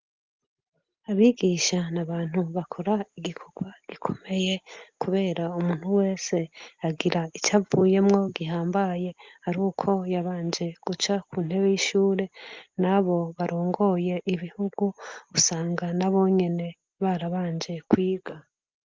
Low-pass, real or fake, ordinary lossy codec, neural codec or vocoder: 7.2 kHz; real; Opus, 24 kbps; none